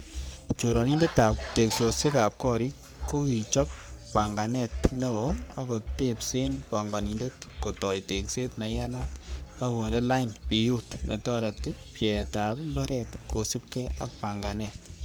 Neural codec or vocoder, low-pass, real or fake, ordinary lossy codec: codec, 44.1 kHz, 3.4 kbps, Pupu-Codec; none; fake; none